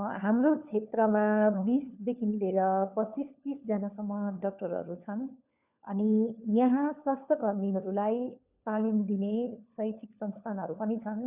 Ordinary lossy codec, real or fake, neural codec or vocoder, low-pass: none; fake; codec, 16 kHz, 4 kbps, FunCodec, trained on LibriTTS, 50 frames a second; 3.6 kHz